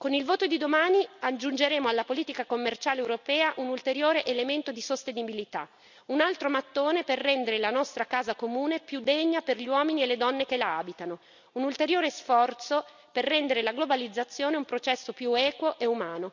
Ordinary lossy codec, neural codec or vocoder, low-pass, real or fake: none; none; 7.2 kHz; real